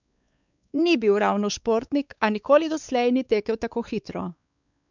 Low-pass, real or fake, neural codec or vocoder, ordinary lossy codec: 7.2 kHz; fake; codec, 16 kHz, 4 kbps, X-Codec, WavLM features, trained on Multilingual LibriSpeech; none